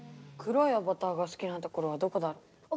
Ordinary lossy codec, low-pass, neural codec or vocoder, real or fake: none; none; none; real